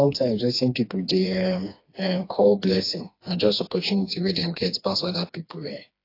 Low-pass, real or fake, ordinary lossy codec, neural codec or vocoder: 5.4 kHz; fake; AAC, 32 kbps; codec, 16 kHz, 2 kbps, FreqCodec, smaller model